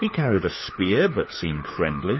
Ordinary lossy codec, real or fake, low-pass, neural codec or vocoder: MP3, 24 kbps; fake; 7.2 kHz; codec, 16 kHz, 4 kbps, FunCodec, trained on LibriTTS, 50 frames a second